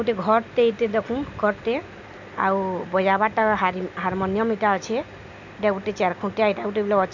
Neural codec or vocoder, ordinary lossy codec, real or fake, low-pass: none; none; real; 7.2 kHz